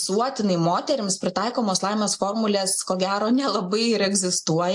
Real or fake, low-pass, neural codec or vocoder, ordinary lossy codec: real; 10.8 kHz; none; AAC, 64 kbps